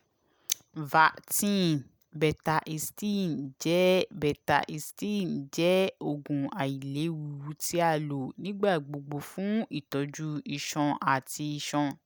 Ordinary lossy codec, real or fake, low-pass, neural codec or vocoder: none; real; none; none